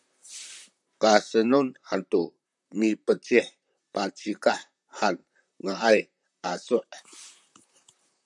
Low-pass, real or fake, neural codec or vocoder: 10.8 kHz; fake; vocoder, 44.1 kHz, 128 mel bands, Pupu-Vocoder